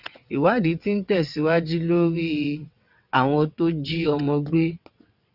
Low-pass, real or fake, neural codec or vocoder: 5.4 kHz; fake; vocoder, 22.05 kHz, 80 mel bands, WaveNeXt